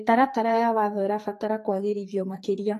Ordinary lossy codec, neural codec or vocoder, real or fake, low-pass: AAC, 48 kbps; codec, 44.1 kHz, 2.6 kbps, SNAC; fake; 14.4 kHz